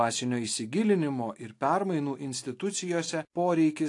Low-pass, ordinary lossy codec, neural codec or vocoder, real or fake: 10.8 kHz; AAC, 48 kbps; none; real